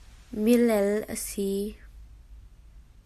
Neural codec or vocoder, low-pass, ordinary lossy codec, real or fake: none; 14.4 kHz; AAC, 96 kbps; real